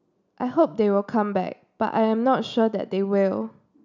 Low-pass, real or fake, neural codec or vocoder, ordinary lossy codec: 7.2 kHz; real; none; none